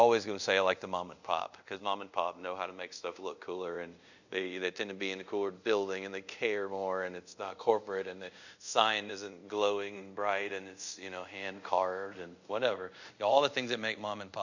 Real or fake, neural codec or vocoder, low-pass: fake; codec, 24 kHz, 0.5 kbps, DualCodec; 7.2 kHz